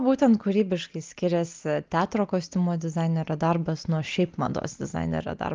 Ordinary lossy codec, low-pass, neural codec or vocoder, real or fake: Opus, 32 kbps; 7.2 kHz; none; real